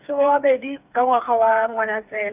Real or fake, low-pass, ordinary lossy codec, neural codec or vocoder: fake; 3.6 kHz; none; codec, 16 kHz, 4 kbps, FreqCodec, smaller model